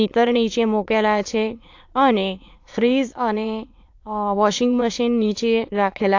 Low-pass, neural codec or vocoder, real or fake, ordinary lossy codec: 7.2 kHz; autoencoder, 22.05 kHz, a latent of 192 numbers a frame, VITS, trained on many speakers; fake; AAC, 48 kbps